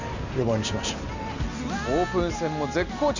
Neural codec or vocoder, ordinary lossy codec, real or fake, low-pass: none; none; real; 7.2 kHz